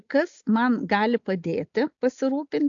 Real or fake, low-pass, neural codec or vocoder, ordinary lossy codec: real; 7.2 kHz; none; AAC, 64 kbps